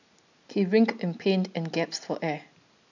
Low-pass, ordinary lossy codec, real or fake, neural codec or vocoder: 7.2 kHz; none; real; none